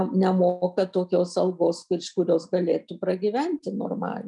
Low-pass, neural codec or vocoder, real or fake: 10.8 kHz; none; real